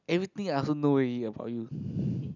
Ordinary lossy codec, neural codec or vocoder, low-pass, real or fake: none; none; 7.2 kHz; real